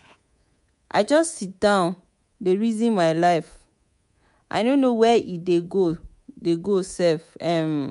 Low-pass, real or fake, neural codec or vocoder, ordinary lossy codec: 10.8 kHz; fake; codec, 24 kHz, 3.1 kbps, DualCodec; MP3, 64 kbps